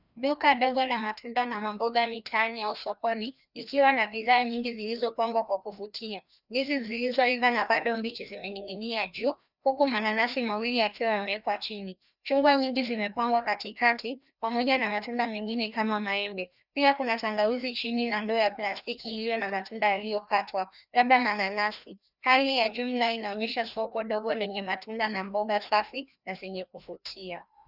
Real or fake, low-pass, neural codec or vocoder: fake; 5.4 kHz; codec, 16 kHz, 1 kbps, FreqCodec, larger model